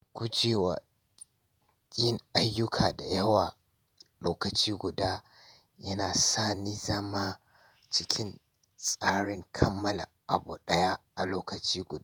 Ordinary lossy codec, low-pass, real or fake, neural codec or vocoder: none; none; fake; vocoder, 48 kHz, 128 mel bands, Vocos